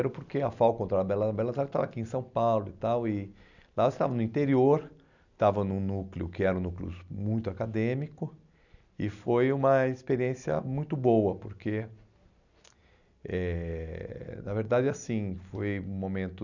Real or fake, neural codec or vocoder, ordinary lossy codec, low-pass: real; none; none; 7.2 kHz